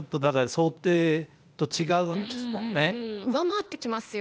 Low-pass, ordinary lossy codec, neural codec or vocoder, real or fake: none; none; codec, 16 kHz, 0.8 kbps, ZipCodec; fake